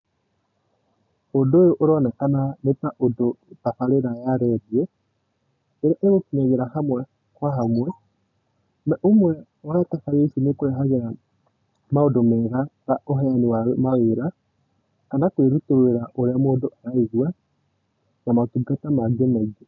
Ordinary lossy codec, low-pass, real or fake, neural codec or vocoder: none; 7.2 kHz; real; none